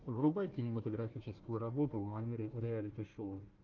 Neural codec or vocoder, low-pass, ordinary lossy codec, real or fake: codec, 16 kHz, 1 kbps, FunCodec, trained on Chinese and English, 50 frames a second; 7.2 kHz; Opus, 32 kbps; fake